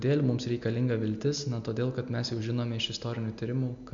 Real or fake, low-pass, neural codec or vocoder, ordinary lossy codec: real; 7.2 kHz; none; MP3, 64 kbps